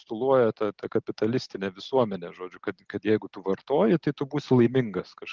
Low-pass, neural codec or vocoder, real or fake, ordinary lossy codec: 7.2 kHz; none; real; Opus, 16 kbps